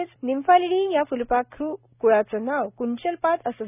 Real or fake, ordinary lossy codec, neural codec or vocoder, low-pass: real; none; none; 3.6 kHz